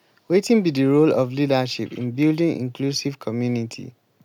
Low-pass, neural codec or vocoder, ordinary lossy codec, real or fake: 19.8 kHz; none; none; real